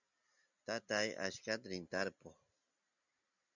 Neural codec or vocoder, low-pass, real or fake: none; 7.2 kHz; real